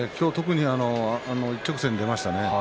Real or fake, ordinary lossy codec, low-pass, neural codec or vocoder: real; none; none; none